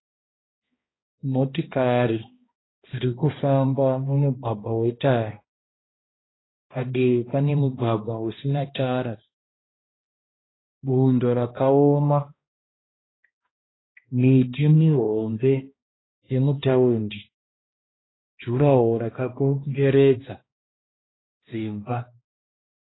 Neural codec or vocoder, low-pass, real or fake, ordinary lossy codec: codec, 16 kHz, 1 kbps, X-Codec, HuBERT features, trained on balanced general audio; 7.2 kHz; fake; AAC, 16 kbps